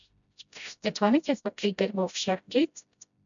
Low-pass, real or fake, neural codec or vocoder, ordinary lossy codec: 7.2 kHz; fake; codec, 16 kHz, 0.5 kbps, FreqCodec, smaller model; MP3, 96 kbps